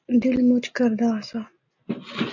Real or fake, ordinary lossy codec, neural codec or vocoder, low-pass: real; AAC, 48 kbps; none; 7.2 kHz